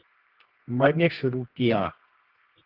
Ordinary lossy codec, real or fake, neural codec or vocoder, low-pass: Opus, 16 kbps; fake; codec, 24 kHz, 0.9 kbps, WavTokenizer, medium music audio release; 5.4 kHz